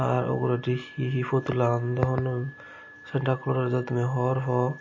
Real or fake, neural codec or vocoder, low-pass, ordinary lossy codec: real; none; 7.2 kHz; MP3, 32 kbps